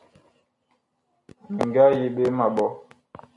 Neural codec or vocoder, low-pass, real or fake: none; 10.8 kHz; real